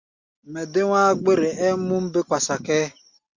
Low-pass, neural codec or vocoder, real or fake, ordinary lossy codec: 7.2 kHz; none; real; Opus, 64 kbps